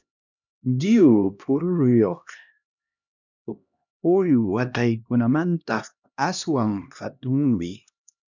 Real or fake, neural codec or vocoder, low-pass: fake; codec, 16 kHz, 1 kbps, X-Codec, HuBERT features, trained on LibriSpeech; 7.2 kHz